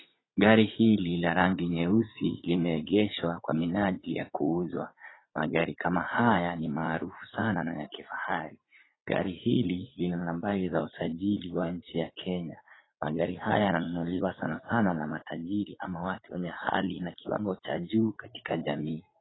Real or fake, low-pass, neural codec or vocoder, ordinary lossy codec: fake; 7.2 kHz; vocoder, 44.1 kHz, 80 mel bands, Vocos; AAC, 16 kbps